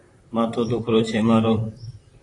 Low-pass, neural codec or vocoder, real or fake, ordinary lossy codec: 10.8 kHz; vocoder, 44.1 kHz, 128 mel bands, Pupu-Vocoder; fake; AAC, 32 kbps